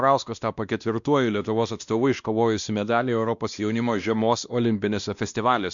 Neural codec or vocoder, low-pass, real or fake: codec, 16 kHz, 1 kbps, X-Codec, WavLM features, trained on Multilingual LibriSpeech; 7.2 kHz; fake